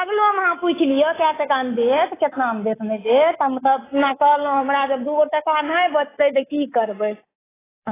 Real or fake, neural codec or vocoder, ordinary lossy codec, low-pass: fake; codec, 24 kHz, 3.1 kbps, DualCodec; AAC, 16 kbps; 3.6 kHz